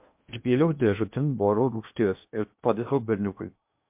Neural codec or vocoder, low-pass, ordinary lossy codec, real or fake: codec, 16 kHz in and 24 kHz out, 0.8 kbps, FocalCodec, streaming, 65536 codes; 3.6 kHz; MP3, 32 kbps; fake